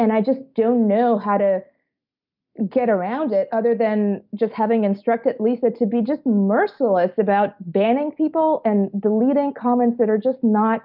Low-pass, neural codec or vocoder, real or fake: 5.4 kHz; none; real